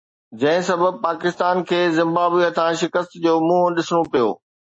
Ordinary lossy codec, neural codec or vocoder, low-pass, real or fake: MP3, 32 kbps; none; 9.9 kHz; real